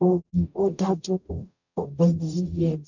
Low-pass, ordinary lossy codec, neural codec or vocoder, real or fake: 7.2 kHz; none; codec, 44.1 kHz, 0.9 kbps, DAC; fake